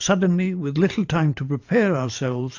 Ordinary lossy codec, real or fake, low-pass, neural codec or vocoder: AAC, 48 kbps; fake; 7.2 kHz; vocoder, 44.1 kHz, 128 mel bands every 512 samples, BigVGAN v2